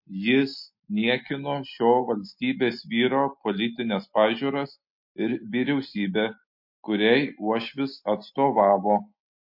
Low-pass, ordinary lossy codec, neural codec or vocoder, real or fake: 5.4 kHz; MP3, 32 kbps; none; real